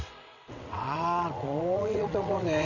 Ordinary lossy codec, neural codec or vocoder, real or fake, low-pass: none; vocoder, 22.05 kHz, 80 mel bands, WaveNeXt; fake; 7.2 kHz